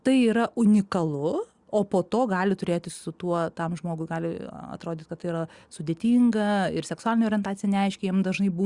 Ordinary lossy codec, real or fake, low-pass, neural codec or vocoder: Opus, 64 kbps; real; 10.8 kHz; none